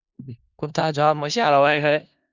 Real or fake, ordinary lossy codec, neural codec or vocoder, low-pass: fake; Opus, 64 kbps; codec, 16 kHz in and 24 kHz out, 0.4 kbps, LongCat-Audio-Codec, four codebook decoder; 7.2 kHz